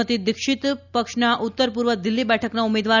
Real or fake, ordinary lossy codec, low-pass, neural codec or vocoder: real; none; 7.2 kHz; none